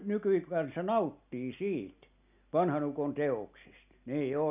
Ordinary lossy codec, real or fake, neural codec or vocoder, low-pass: none; real; none; 3.6 kHz